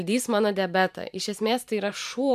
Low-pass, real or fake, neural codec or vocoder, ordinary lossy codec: 14.4 kHz; fake; vocoder, 44.1 kHz, 128 mel bands every 512 samples, BigVGAN v2; MP3, 96 kbps